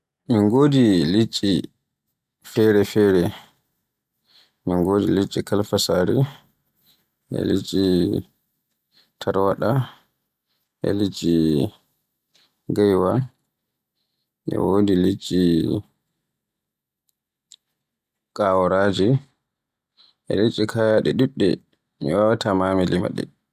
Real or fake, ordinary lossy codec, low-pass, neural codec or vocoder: real; none; 14.4 kHz; none